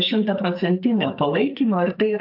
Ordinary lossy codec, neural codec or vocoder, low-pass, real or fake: AAC, 48 kbps; codec, 44.1 kHz, 2.6 kbps, SNAC; 5.4 kHz; fake